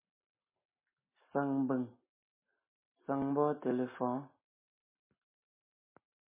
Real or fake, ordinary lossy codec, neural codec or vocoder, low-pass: real; MP3, 16 kbps; none; 3.6 kHz